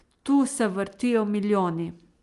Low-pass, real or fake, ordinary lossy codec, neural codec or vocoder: 10.8 kHz; real; Opus, 32 kbps; none